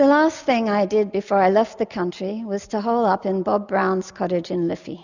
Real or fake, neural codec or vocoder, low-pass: real; none; 7.2 kHz